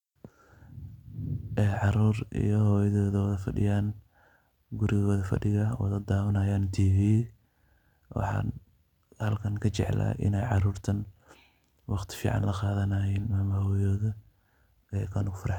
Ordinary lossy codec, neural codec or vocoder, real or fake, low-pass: none; none; real; 19.8 kHz